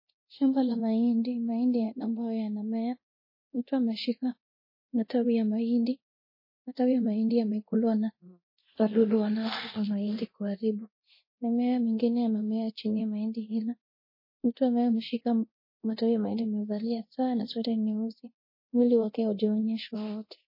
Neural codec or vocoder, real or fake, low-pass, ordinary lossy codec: codec, 24 kHz, 0.9 kbps, DualCodec; fake; 5.4 kHz; MP3, 24 kbps